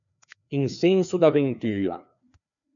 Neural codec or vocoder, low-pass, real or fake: codec, 16 kHz, 2 kbps, FreqCodec, larger model; 7.2 kHz; fake